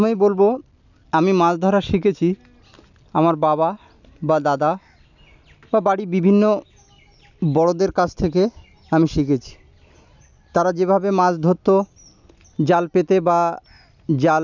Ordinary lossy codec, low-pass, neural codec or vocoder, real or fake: none; 7.2 kHz; none; real